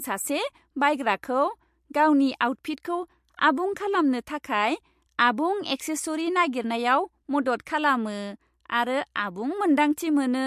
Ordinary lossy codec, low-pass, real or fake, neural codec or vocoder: MP3, 64 kbps; 14.4 kHz; real; none